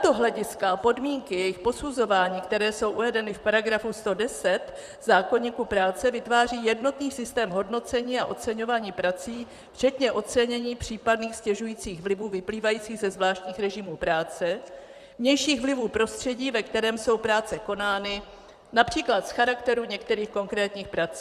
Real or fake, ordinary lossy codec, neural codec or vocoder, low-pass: fake; Opus, 64 kbps; vocoder, 44.1 kHz, 128 mel bands, Pupu-Vocoder; 14.4 kHz